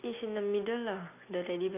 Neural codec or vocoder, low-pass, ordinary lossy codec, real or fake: none; 3.6 kHz; none; real